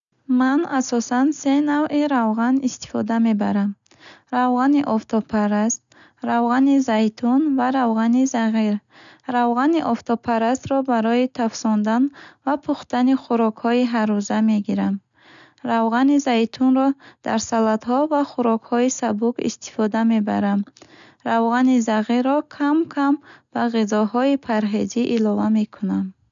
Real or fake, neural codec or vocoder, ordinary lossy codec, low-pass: real; none; none; 7.2 kHz